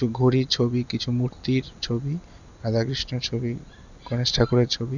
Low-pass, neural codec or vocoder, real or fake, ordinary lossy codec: 7.2 kHz; none; real; none